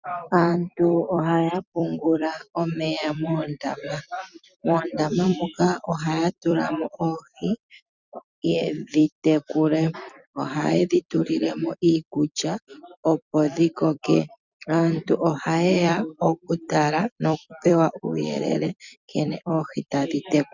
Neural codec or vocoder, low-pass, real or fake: vocoder, 44.1 kHz, 128 mel bands every 256 samples, BigVGAN v2; 7.2 kHz; fake